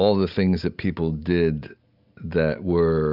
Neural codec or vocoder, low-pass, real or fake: none; 5.4 kHz; real